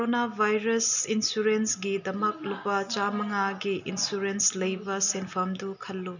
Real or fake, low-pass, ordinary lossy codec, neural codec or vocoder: real; 7.2 kHz; none; none